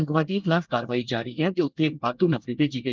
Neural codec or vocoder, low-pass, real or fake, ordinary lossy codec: codec, 24 kHz, 1 kbps, SNAC; 7.2 kHz; fake; Opus, 32 kbps